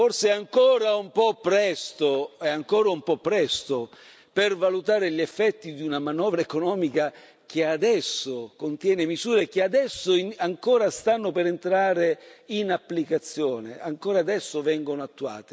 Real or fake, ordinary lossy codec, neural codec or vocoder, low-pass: real; none; none; none